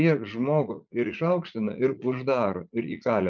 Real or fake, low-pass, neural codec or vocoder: fake; 7.2 kHz; vocoder, 44.1 kHz, 80 mel bands, Vocos